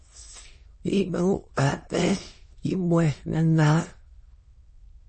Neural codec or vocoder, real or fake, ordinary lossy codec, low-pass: autoencoder, 22.05 kHz, a latent of 192 numbers a frame, VITS, trained on many speakers; fake; MP3, 32 kbps; 9.9 kHz